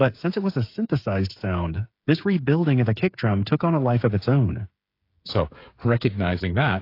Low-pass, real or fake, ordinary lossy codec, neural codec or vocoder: 5.4 kHz; fake; AAC, 32 kbps; codec, 16 kHz, 8 kbps, FreqCodec, smaller model